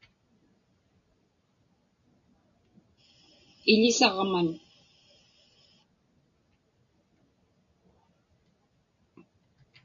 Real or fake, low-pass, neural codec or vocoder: real; 7.2 kHz; none